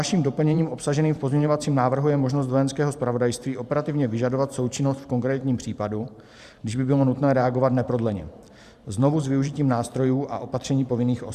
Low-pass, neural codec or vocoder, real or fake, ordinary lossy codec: 14.4 kHz; vocoder, 44.1 kHz, 128 mel bands every 512 samples, BigVGAN v2; fake; Opus, 64 kbps